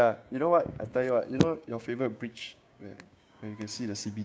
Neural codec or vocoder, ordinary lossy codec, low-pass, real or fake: codec, 16 kHz, 6 kbps, DAC; none; none; fake